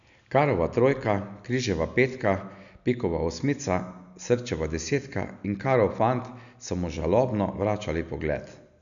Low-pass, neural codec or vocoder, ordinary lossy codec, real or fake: 7.2 kHz; none; none; real